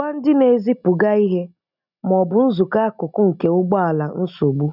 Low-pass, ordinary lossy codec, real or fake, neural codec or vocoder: 5.4 kHz; none; real; none